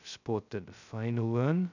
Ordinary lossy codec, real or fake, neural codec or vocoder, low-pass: none; fake; codec, 16 kHz, 0.2 kbps, FocalCodec; 7.2 kHz